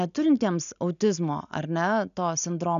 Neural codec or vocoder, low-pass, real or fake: none; 7.2 kHz; real